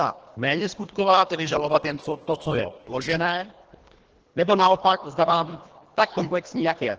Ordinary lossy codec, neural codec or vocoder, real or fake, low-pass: Opus, 16 kbps; codec, 24 kHz, 1.5 kbps, HILCodec; fake; 7.2 kHz